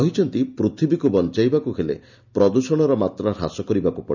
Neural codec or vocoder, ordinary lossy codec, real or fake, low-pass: none; none; real; 7.2 kHz